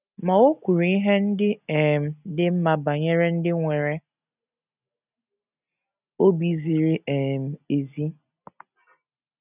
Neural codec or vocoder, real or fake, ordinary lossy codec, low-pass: none; real; none; 3.6 kHz